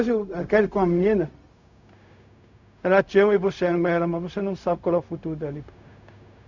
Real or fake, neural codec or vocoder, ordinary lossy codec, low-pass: fake; codec, 16 kHz, 0.4 kbps, LongCat-Audio-Codec; none; 7.2 kHz